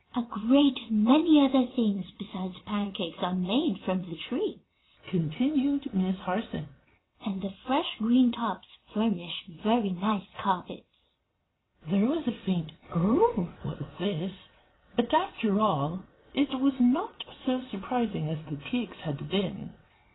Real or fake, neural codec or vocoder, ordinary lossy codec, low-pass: fake; vocoder, 22.05 kHz, 80 mel bands, WaveNeXt; AAC, 16 kbps; 7.2 kHz